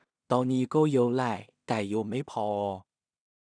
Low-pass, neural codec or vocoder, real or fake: 9.9 kHz; codec, 16 kHz in and 24 kHz out, 0.4 kbps, LongCat-Audio-Codec, two codebook decoder; fake